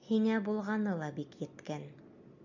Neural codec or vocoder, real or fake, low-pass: none; real; 7.2 kHz